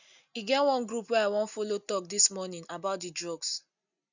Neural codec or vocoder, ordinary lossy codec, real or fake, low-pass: none; none; real; 7.2 kHz